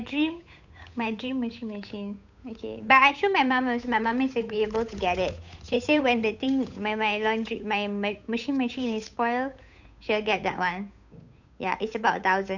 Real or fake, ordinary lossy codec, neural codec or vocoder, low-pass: fake; none; codec, 16 kHz, 8 kbps, FunCodec, trained on Chinese and English, 25 frames a second; 7.2 kHz